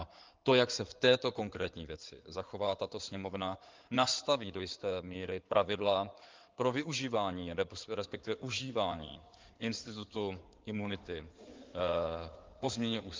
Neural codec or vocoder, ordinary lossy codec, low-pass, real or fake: codec, 16 kHz in and 24 kHz out, 2.2 kbps, FireRedTTS-2 codec; Opus, 32 kbps; 7.2 kHz; fake